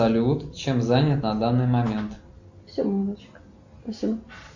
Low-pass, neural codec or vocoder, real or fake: 7.2 kHz; none; real